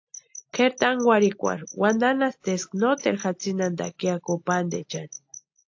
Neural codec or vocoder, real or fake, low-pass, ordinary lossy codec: none; real; 7.2 kHz; AAC, 48 kbps